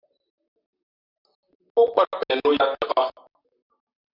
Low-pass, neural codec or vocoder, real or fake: 5.4 kHz; vocoder, 44.1 kHz, 128 mel bands, Pupu-Vocoder; fake